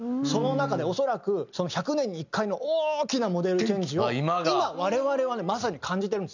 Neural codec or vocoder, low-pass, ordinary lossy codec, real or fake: none; 7.2 kHz; none; real